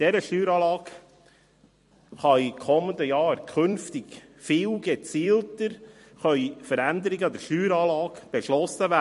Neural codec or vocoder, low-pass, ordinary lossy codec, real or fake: vocoder, 44.1 kHz, 128 mel bands every 256 samples, BigVGAN v2; 14.4 kHz; MP3, 48 kbps; fake